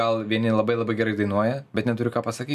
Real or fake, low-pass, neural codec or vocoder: real; 14.4 kHz; none